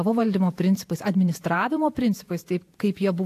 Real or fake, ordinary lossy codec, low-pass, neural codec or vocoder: fake; AAC, 64 kbps; 14.4 kHz; vocoder, 48 kHz, 128 mel bands, Vocos